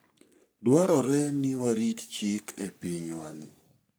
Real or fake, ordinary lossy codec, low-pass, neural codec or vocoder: fake; none; none; codec, 44.1 kHz, 3.4 kbps, Pupu-Codec